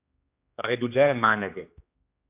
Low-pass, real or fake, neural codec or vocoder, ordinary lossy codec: 3.6 kHz; fake; codec, 16 kHz, 2 kbps, X-Codec, HuBERT features, trained on general audio; AAC, 32 kbps